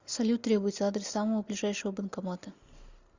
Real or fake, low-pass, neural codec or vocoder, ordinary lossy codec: real; 7.2 kHz; none; Opus, 64 kbps